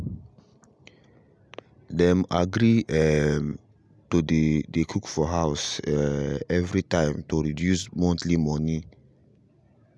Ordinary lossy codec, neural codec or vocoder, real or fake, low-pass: none; none; real; none